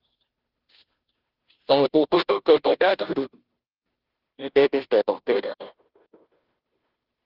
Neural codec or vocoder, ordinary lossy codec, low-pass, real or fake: codec, 16 kHz, 0.5 kbps, FunCodec, trained on Chinese and English, 25 frames a second; Opus, 32 kbps; 5.4 kHz; fake